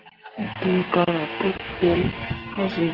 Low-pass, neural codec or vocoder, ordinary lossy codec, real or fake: 5.4 kHz; codec, 44.1 kHz, 2.6 kbps, SNAC; Opus, 16 kbps; fake